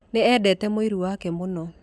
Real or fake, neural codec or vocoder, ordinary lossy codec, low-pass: real; none; none; none